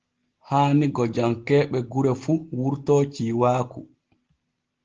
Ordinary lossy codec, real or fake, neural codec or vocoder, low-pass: Opus, 16 kbps; real; none; 7.2 kHz